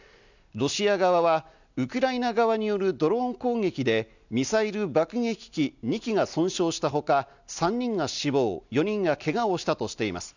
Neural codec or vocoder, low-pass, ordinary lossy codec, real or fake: none; 7.2 kHz; none; real